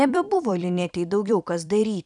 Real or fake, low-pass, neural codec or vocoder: fake; 10.8 kHz; vocoder, 44.1 kHz, 128 mel bands every 256 samples, BigVGAN v2